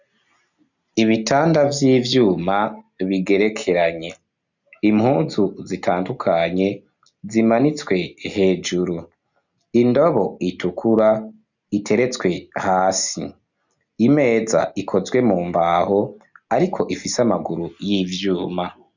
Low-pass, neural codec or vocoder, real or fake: 7.2 kHz; none; real